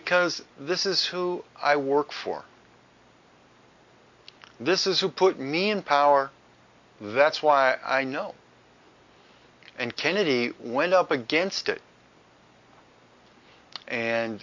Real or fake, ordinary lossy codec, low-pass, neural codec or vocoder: real; MP3, 48 kbps; 7.2 kHz; none